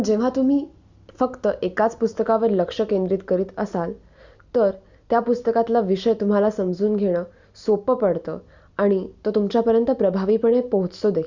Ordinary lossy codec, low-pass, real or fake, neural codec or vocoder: Opus, 64 kbps; 7.2 kHz; real; none